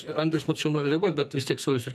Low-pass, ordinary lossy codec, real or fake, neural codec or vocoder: 14.4 kHz; MP3, 96 kbps; fake; codec, 44.1 kHz, 2.6 kbps, SNAC